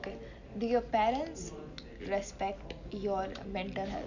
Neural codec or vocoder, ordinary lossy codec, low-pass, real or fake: none; none; 7.2 kHz; real